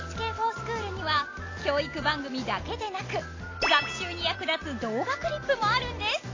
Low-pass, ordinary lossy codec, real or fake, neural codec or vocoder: 7.2 kHz; AAC, 32 kbps; real; none